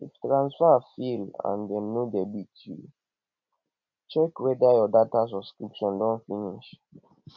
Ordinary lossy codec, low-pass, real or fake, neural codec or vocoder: MP3, 48 kbps; 7.2 kHz; real; none